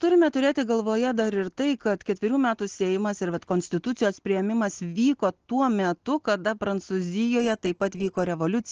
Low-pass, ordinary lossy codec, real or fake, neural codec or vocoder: 7.2 kHz; Opus, 16 kbps; real; none